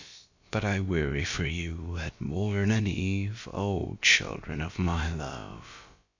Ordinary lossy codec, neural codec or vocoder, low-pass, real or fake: AAC, 48 kbps; codec, 16 kHz, about 1 kbps, DyCAST, with the encoder's durations; 7.2 kHz; fake